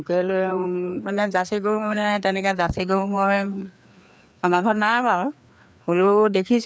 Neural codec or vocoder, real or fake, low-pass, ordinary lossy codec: codec, 16 kHz, 2 kbps, FreqCodec, larger model; fake; none; none